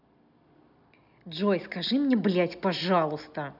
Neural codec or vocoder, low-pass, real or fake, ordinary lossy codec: none; 5.4 kHz; real; none